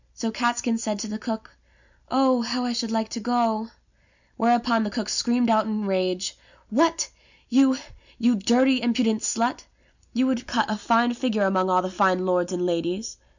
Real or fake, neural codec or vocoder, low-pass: real; none; 7.2 kHz